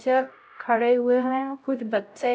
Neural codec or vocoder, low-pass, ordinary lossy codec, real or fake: codec, 16 kHz, 0.5 kbps, X-Codec, HuBERT features, trained on LibriSpeech; none; none; fake